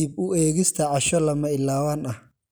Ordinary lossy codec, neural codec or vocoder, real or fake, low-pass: none; none; real; none